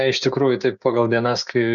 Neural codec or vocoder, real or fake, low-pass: codec, 16 kHz, 6 kbps, DAC; fake; 7.2 kHz